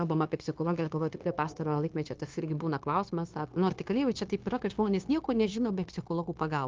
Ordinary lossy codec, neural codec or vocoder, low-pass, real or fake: Opus, 32 kbps; codec, 16 kHz, 0.9 kbps, LongCat-Audio-Codec; 7.2 kHz; fake